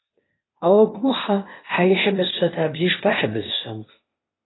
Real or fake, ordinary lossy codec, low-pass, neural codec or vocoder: fake; AAC, 16 kbps; 7.2 kHz; codec, 16 kHz, 0.8 kbps, ZipCodec